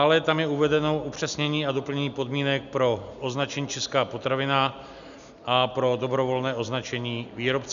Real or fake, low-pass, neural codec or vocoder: real; 7.2 kHz; none